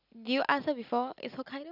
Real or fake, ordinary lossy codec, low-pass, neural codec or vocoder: real; none; 5.4 kHz; none